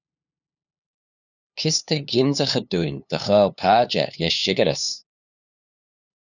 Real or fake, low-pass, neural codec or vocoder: fake; 7.2 kHz; codec, 16 kHz, 2 kbps, FunCodec, trained on LibriTTS, 25 frames a second